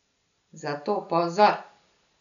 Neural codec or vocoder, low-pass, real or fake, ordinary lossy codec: none; 7.2 kHz; real; none